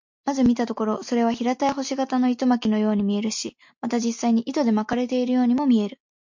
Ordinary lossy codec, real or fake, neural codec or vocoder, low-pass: MP3, 48 kbps; real; none; 7.2 kHz